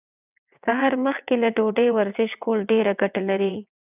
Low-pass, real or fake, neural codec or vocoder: 3.6 kHz; fake; vocoder, 22.05 kHz, 80 mel bands, WaveNeXt